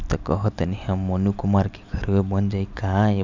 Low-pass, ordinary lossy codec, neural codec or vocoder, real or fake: 7.2 kHz; none; none; real